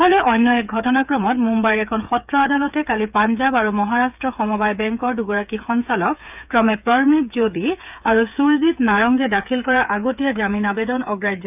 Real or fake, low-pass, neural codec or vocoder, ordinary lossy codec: fake; 3.6 kHz; codec, 16 kHz, 8 kbps, FreqCodec, smaller model; none